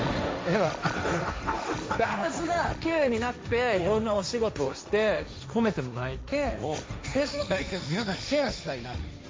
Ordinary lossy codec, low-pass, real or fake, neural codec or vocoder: none; none; fake; codec, 16 kHz, 1.1 kbps, Voila-Tokenizer